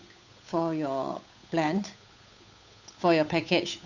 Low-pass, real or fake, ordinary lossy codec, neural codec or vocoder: 7.2 kHz; fake; none; codec, 16 kHz, 8 kbps, FunCodec, trained on Chinese and English, 25 frames a second